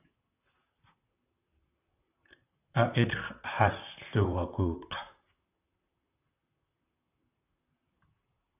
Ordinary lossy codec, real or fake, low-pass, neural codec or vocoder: AAC, 32 kbps; fake; 3.6 kHz; vocoder, 44.1 kHz, 128 mel bands, Pupu-Vocoder